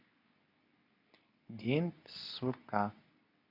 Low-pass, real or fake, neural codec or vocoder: 5.4 kHz; fake; codec, 24 kHz, 0.9 kbps, WavTokenizer, medium speech release version 2